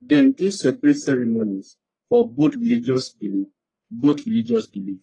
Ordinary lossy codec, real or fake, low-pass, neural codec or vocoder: AAC, 32 kbps; fake; 9.9 kHz; codec, 44.1 kHz, 1.7 kbps, Pupu-Codec